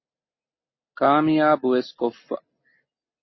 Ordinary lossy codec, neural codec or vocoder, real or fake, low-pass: MP3, 24 kbps; none; real; 7.2 kHz